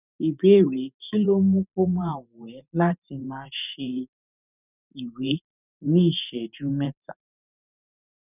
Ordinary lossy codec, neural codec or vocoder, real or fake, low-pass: none; vocoder, 44.1 kHz, 128 mel bands every 512 samples, BigVGAN v2; fake; 3.6 kHz